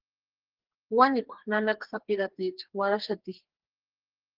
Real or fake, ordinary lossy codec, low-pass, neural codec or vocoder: fake; Opus, 16 kbps; 5.4 kHz; codec, 44.1 kHz, 2.6 kbps, SNAC